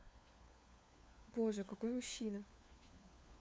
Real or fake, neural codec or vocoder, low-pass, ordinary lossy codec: fake; codec, 16 kHz, 4 kbps, FunCodec, trained on LibriTTS, 50 frames a second; none; none